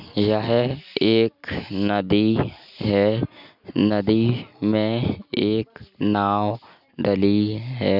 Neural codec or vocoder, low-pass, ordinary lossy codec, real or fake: none; 5.4 kHz; none; real